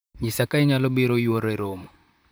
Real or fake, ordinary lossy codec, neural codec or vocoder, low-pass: fake; none; vocoder, 44.1 kHz, 128 mel bands, Pupu-Vocoder; none